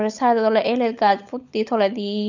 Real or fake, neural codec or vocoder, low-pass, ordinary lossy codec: fake; codec, 16 kHz, 16 kbps, FunCodec, trained on LibriTTS, 50 frames a second; 7.2 kHz; none